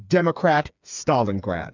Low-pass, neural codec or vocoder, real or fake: 7.2 kHz; codec, 16 kHz, 4 kbps, FreqCodec, smaller model; fake